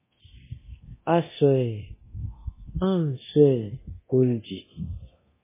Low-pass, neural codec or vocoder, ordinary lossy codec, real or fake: 3.6 kHz; codec, 24 kHz, 0.9 kbps, DualCodec; MP3, 16 kbps; fake